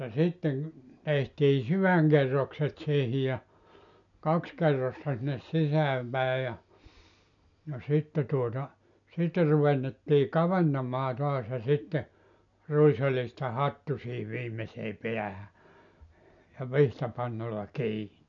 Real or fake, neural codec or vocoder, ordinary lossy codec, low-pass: real; none; none; 7.2 kHz